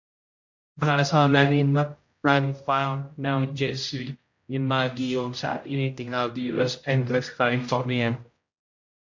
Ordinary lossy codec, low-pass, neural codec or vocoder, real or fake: MP3, 48 kbps; 7.2 kHz; codec, 16 kHz, 0.5 kbps, X-Codec, HuBERT features, trained on general audio; fake